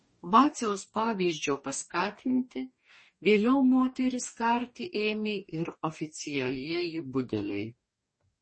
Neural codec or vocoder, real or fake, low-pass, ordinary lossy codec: codec, 44.1 kHz, 2.6 kbps, DAC; fake; 9.9 kHz; MP3, 32 kbps